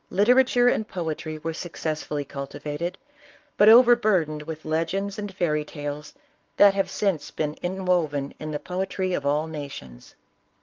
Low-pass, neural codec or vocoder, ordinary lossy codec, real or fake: 7.2 kHz; codec, 44.1 kHz, 7.8 kbps, Pupu-Codec; Opus, 24 kbps; fake